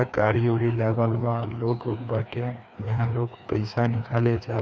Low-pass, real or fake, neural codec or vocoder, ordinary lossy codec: none; fake; codec, 16 kHz, 2 kbps, FreqCodec, larger model; none